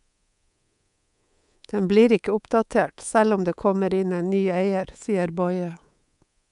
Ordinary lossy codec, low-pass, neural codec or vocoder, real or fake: none; 10.8 kHz; codec, 24 kHz, 3.1 kbps, DualCodec; fake